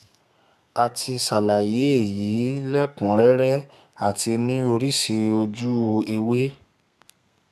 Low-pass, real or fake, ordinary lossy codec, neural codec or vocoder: 14.4 kHz; fake; none; codec, 32 kHz, 1.9 kbps, SNAC